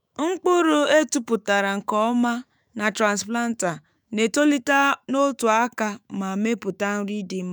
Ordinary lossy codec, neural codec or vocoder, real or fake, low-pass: none; autoencoder, 48 kHz, 128 numbers a frame, DAC-VAE, trained on Japanese speech; fake; none